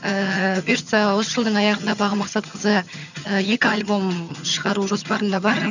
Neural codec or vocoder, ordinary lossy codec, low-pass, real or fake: vocoder, 22.05 kHz, 80 mel bands, HiFi-GAN; none; 7.2 kHz; fake